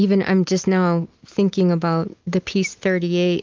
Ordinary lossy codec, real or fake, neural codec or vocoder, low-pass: Opus, 24 kbps; real; none; 7.2 kHz